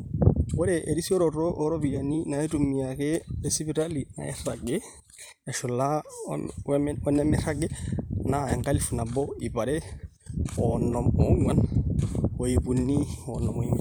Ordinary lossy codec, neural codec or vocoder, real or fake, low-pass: none; vocoder, 44.1 kHz, 128 mel bands every 512 samples, BigVGAN v2; fake; none